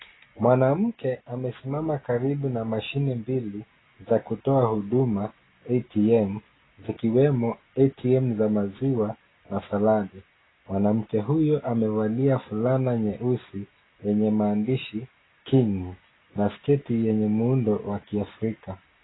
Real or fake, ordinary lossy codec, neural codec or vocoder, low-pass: real; AAC, 16 kbps; none; 7.2 kHz